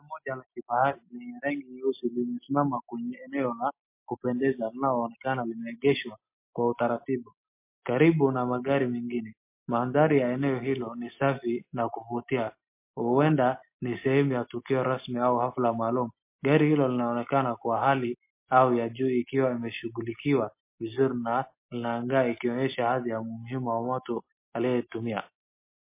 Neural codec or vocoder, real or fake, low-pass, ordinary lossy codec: none; real; 3.6 kHz; MP3, 24 kbps